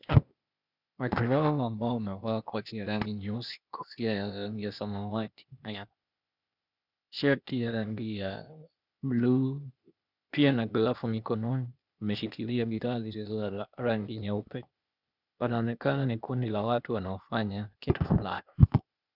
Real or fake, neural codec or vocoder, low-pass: fake; codec, 16 kHz, 0.8 kbps, ZipCodec; 5.4 kHz